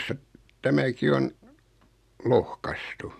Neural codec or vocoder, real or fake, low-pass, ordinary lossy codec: none; real; 14.4 kHz; none